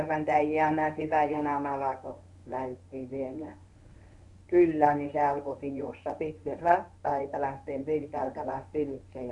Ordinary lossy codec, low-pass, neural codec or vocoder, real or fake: none; 10.8 kHz; codec, 24 kHz, 0.9 kbps, WavTokenizer, medium speech release version 1; fake